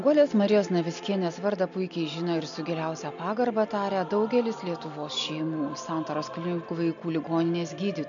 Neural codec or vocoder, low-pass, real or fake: none; 7.2 kHz; real